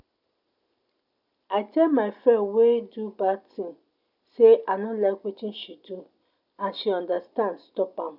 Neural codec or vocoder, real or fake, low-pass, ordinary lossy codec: none; real; 5.4 kHz; none